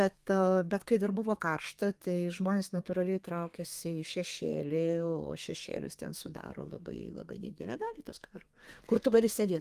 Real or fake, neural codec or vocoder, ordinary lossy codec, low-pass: fake; codec, 32 kHz, 1.9 kbps, SNAC; Opus, 32 kbps; 14.4 kHz